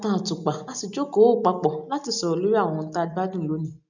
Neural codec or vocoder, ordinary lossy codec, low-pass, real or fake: none; none; 7.2 kHz; real